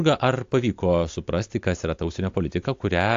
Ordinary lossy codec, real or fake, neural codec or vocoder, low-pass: AAC, 48 kbps; real; none; 7.2 kHz